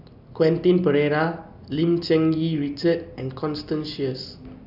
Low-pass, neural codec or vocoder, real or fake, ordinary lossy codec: 5.4 kHz; none; real; none